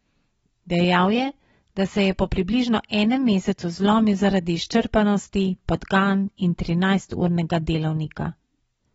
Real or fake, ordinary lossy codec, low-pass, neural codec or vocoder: real; AAC, 24 kbps; 19.8 kHz; none